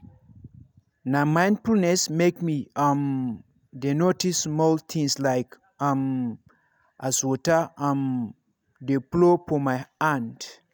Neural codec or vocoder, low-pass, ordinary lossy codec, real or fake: none; none; none; real